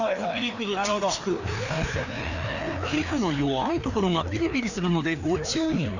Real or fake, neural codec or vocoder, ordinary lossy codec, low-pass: fake; codec, 16 kHz, 2 kbps, FreqCodec, larger model; none; 7.2 kHz